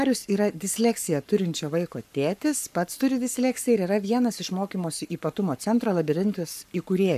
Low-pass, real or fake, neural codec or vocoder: 14.4 kHz; fake; codec, 44.1 kHz, 7.8 kbps, Pupu-Codec